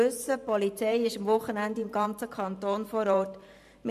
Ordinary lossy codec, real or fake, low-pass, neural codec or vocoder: MP3, 96 kbps; fake; 14.4 kHz; vocoder, 44.1 kHz, 128 mel bands every 512 samples, BigVGAN v2